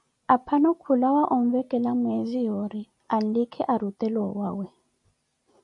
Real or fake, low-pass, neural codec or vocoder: real; 10.8 kHz; none